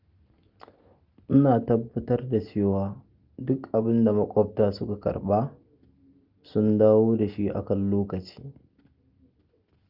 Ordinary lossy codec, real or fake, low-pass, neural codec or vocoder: Opus, 24 kbps; real; 5.4 kHz; none